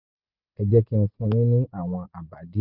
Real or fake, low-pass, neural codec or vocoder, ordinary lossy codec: real; 5.4 kHz; none; Opus, 64 kbps